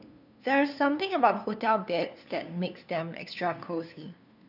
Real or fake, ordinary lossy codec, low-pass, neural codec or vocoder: fake; none; 5.4 kHz; codec, 16 kHz, 2 kbps, FunCodec, trained on LibriTTS, 25 frames a second